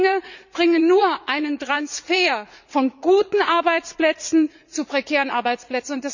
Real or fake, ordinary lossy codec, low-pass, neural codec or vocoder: fake; none; 7.2 kHz; vocoder, 44.1 kHz, 80 mel bands, Vocos